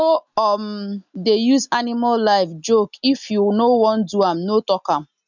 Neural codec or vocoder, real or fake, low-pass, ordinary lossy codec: none; real; 7.2 kHz; none